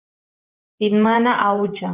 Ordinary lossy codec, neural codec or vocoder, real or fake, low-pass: Opus, 24 kbps; vocoder, 24 kHz, 100 mel bands, Vocos; fake; 3.6 kHz